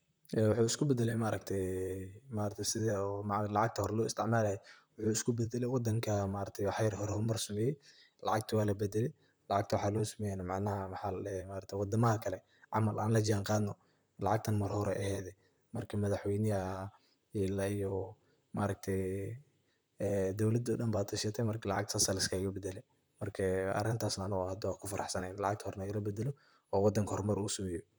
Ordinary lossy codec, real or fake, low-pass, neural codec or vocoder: none; fake; none; vocoder, 44.1 kHz, 128 mel bands, Pupu-Vocoder